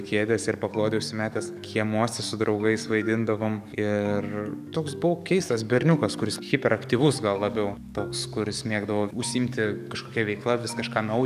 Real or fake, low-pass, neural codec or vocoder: fake; 14.4 kHz; codec, 44.1 kHz, 7.8 kbps, DAC